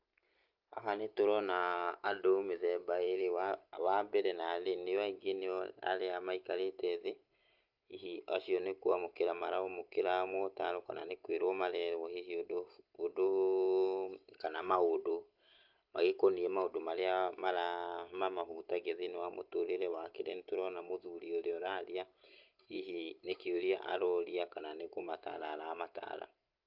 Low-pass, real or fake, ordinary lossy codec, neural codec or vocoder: 5.4 kHz; real; Opus, 24 kbps; none